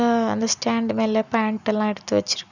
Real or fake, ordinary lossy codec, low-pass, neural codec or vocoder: real; none; 7.2 kHz; none